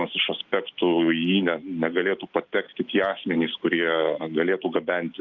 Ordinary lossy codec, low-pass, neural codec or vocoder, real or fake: Opus, 32 kbps; 7.2 kHz; none; real